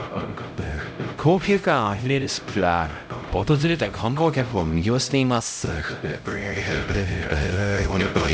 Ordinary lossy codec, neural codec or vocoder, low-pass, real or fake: none; codec, 16 kHz, 0.5 kbps, X-Codec, HuBERT features, trained on LibriSpeech; none; fake